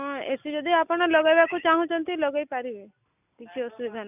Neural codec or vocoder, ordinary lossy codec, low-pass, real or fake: none; none; 3.6 kHz; real